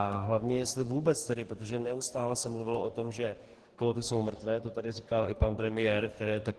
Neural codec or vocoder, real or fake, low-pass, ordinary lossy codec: codec, 44.1 kHz, 2.6 kbps, DAC; fake; 10.8 kHz; Opus, 16 kbps